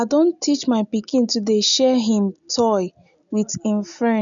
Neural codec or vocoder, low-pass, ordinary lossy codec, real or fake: none; 7.2 kHz; none; real